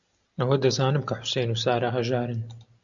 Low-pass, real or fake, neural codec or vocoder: 7.2 kHz; real; none